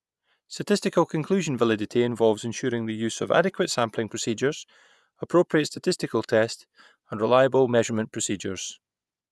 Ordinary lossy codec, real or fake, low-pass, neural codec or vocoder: none; fake; none; vocoder, 24 kHz, 100 mel bands, Vocos